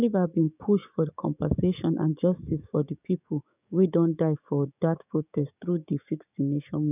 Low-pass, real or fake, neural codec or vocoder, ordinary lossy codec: 3.6 kHz; fake; codec, 16 kHz, 6 kbps, DAC; none